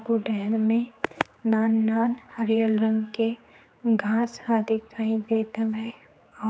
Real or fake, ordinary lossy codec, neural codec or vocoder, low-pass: fake; none; codec, 16 kHz, 4 kbps, X-Codec, HuBERT features, trained on general audio; none